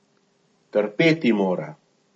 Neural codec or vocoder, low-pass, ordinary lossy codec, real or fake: none; 9.9 kHz; MP3, 32 kbps; real